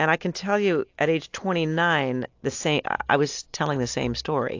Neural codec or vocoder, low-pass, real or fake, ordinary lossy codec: none; 7.2 kHz; real; AAC, 48 kbps